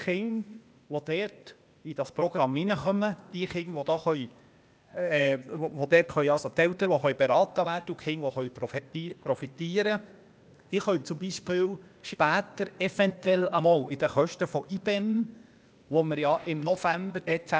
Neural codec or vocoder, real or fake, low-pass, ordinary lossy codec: codec, 16 kHz, 0.8 kbps, ZipCodec; fake; none; none